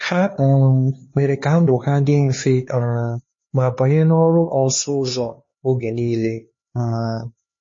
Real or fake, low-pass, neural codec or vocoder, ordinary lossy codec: fake; 7.2 kHz; codec, 16 kHz, 2 kbps, X-Codec, HuBERT features, trained on LibriSpeech; MP3, 32 kbps